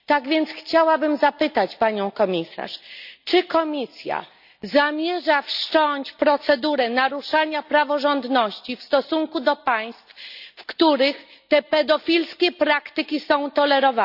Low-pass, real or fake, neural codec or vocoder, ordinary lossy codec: 5.4 kHz; real; none; none